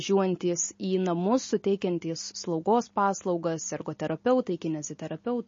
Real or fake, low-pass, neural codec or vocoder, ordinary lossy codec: real; 7.2 kHz; none; MP3, 32 kbps